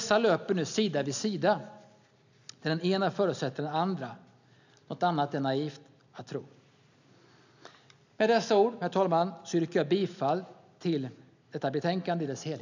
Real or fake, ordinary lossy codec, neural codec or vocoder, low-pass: real; none; none; 7.2 kHz